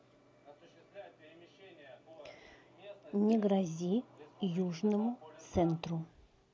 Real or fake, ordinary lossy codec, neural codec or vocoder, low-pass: real; none; none; none